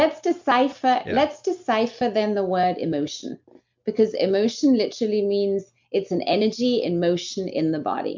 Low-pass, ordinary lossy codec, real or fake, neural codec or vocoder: 7.2 kHz; MP3, 64 kbps; real; none